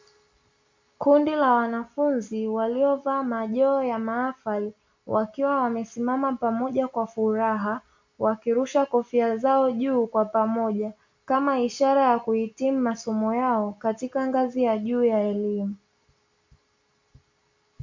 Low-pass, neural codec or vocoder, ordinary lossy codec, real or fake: 7.2 kHz; none; MP3, 48 kbps; real